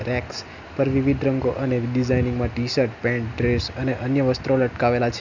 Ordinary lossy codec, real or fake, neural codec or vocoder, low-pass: none; real; none; 7.2 kHz